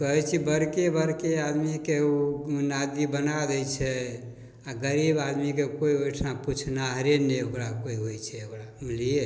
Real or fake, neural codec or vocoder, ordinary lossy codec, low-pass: real; none; none; none